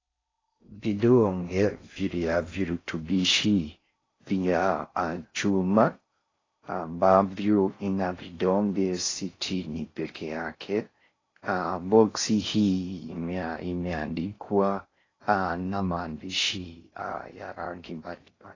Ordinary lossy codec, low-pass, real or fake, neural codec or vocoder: AAC, 32 kbps; 7.2 kHz; fake; codec, 16 kHz in and 24 kHz out, 0.6 kbps, FocalCodec, streaming, 4096 codes